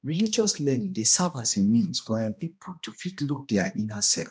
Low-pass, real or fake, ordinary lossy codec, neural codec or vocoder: none; fake; none; codec, 16 kHz, 1 kbps, X-Codec, HuBERT features, trained on balanced general audio